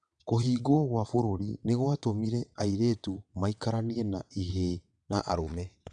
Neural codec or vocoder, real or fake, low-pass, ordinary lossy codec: vocoder, 22.05 kHz, 80 mel bands, WaveNeXt; fake; 9.9 kHz; MP3, 96 kbps